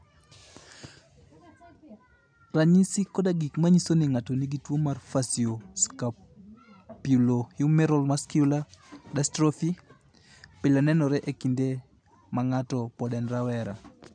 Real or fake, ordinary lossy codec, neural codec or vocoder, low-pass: real; none; none; 9.9 kHz